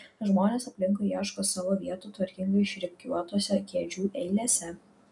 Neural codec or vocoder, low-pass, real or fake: none; 10.8 kHz; real